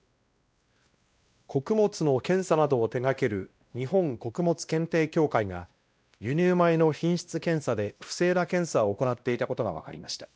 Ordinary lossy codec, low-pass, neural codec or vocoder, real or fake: none; none; codec, 16 kHz, 1 kbps, X-Codec, WavLM features, trained on Multilingual LibriSpeech; fake